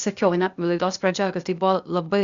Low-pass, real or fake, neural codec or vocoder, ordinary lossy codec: 7.2 kHz; fake; codec, 16 kHz, 0.8 kbps, ZipCodec; Opus, 64 kbps